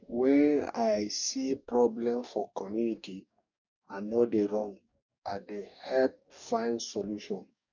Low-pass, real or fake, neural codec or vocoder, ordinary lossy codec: 7.2 kHz; fake; codec, 44.1 kHz, 2.6 kbps, DAC; none